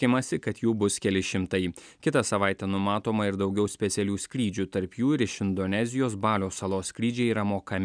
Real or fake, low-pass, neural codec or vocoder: real; 9.9 kHz; none